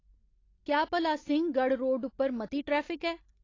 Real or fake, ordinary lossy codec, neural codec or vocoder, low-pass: real; AAC, 32 kbps; none; 7.2 kHz